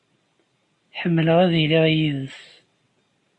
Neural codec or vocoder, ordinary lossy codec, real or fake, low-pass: none; AAC, 48 kbps; real; 10.8 kHz